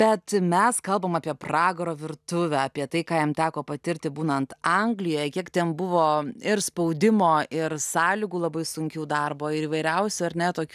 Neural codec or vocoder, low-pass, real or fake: none; 14.4 kHz; real